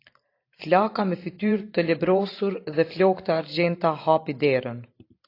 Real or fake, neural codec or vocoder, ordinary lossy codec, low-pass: fake; vocoder, 44.1 kHz, 128 mel bands every 512 samples, BigVGAN v2; AAC, 32 kbps; 5.4 kHz